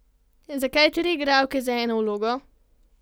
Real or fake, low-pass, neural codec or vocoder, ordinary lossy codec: real; none; none; none